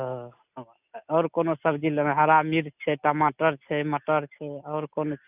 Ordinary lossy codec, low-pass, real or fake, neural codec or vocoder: none; 3.6 kHz; real; none